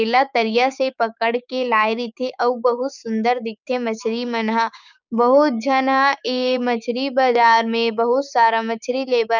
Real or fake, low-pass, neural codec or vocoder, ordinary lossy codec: fake; 7.2 kHz; codec, 16 kHz, 6 kbps, DAC; none